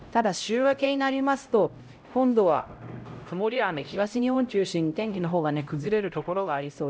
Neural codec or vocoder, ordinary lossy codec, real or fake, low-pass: codec, 16 kHz, 0.5 kbps, X-Codec, HuBERT features, trained on LibriSpeech; none; fake; none